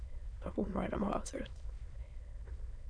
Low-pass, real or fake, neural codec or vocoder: 9.9 kHz; fake; autoencoder, 22.05 kHz, a latent of 192 numbers a frame, VITS, trained on many speakers